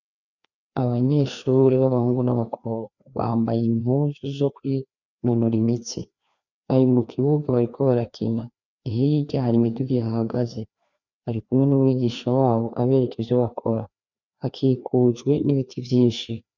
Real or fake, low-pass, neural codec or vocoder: fake; 7.2 kHz; codec, 16 kHz, 2 kbps, FreqCodec, larger model